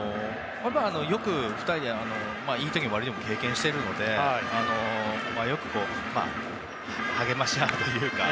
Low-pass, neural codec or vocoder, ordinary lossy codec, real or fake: none; none; none; real